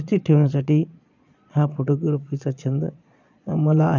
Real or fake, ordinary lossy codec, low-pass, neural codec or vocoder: fake; none; 7.2 kHz; vocoder, 22.05 kHz, 80 mel bands, Vocos